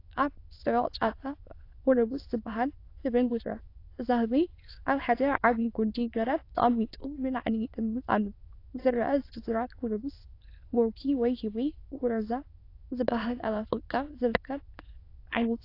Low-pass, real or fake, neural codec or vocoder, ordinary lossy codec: 5.4 kHz; fake; autoencoder, 22.05 kHz, a latent of 192 numbers a frame, VITS, trained on many speakers; AAC, 32 kbps